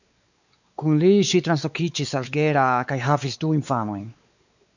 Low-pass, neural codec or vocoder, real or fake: 7.2 kHz; codec, 16 kHz, 4 kbps, X-Codec, WavLM features, trained on Multilingual LibriSpeech; fake